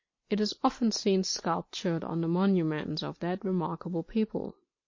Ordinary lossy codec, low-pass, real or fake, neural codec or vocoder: MP3, 32 kbps; 7.2 kHz; fake; vocoder, 44.1 kHz, 128 mel bands every 256 samples, BigVGAN v2